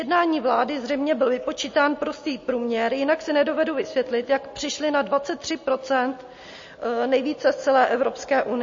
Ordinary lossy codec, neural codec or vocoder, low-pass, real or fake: MP3, 32 kbps; none; 7.2 kHz; real